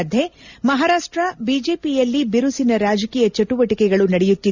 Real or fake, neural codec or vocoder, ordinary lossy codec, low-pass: real; none; none; 7.2 kHz